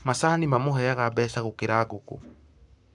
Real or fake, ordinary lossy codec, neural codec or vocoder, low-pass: fake; none; codec, 44.1 kHz, 7.8 kbps, Pupu-Codec; 10.8 kHz